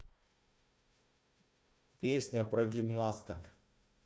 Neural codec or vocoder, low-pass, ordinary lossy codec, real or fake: codec, 16 kHz, 1 kbps, FunCodec, trained on Chinese and English, 50 frames a second; none; none; fake